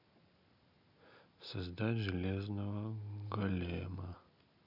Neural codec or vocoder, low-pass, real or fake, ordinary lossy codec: none; 5.4 kHz; real; none